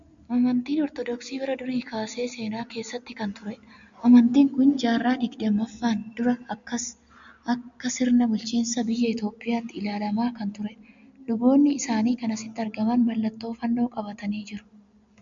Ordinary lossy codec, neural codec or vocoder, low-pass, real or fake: MP3, 64 kbps; none; 7.2 kHz; real